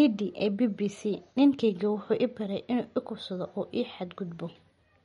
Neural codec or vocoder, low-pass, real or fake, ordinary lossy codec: none; 19.8 kHz; real; MP3, 48 kbps